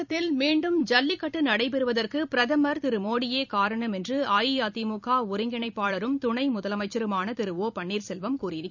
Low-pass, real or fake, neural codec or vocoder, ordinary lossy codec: 7.2 kHz; fake; vocoder, 44.1 kHz, 128 mel bands every 256 samples, BigVGAN v2; none